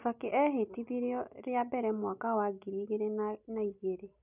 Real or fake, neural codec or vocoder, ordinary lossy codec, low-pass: real; none; none; 3.6 kHz